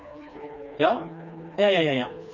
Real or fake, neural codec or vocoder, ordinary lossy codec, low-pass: fake; codec, 16 kHz, 2 kbps, FreqCodec, smaller model; none; 7.2 kHz